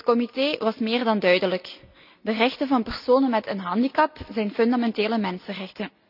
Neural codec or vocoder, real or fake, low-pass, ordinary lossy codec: none; real; 5.4 kHz; none